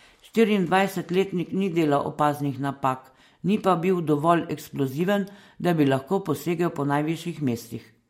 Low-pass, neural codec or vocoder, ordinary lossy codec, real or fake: 19.8 kHz; none; MP3, 64 kbps; real